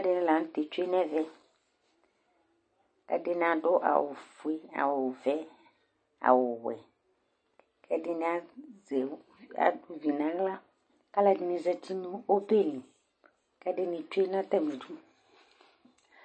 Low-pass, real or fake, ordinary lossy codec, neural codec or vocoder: 9.9 kHz; real; MP3, 32 kbps; none